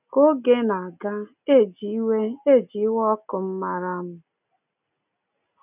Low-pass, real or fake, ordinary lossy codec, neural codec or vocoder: 3.6 kHz; real; none; none